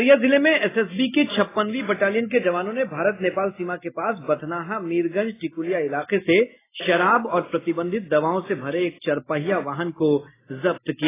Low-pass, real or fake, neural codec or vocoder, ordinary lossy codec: 3.6 kHz; real; none; AAC, 16 kbps